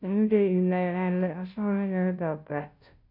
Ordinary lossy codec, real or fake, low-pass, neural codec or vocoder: none; fake; 5.4 kHz; codec, 16 kHz, 0.5 kbps, FunCodec, trained on Chinese and English, 25 frames a second